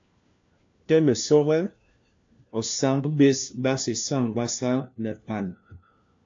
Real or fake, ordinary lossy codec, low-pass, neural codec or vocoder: fake; AAC, 48 kbps; 7.2 kHz; codec, 16 kHz, 1 kbps, FunCodec, trained on LibriTTS, 50 frames a second